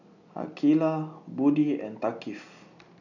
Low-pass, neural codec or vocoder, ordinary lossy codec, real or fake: 7.2 kHz; none; none; real